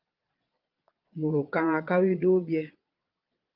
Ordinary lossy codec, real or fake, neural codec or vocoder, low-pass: Opus, 32 kbps; fake; vocoder, 22.05 kHz, 80 mel bands, Vocos; 5.4 kHz